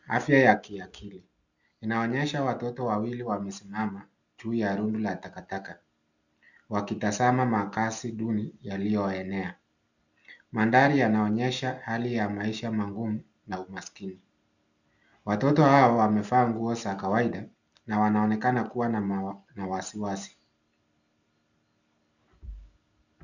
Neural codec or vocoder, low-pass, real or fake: none; 7.2 kHz; real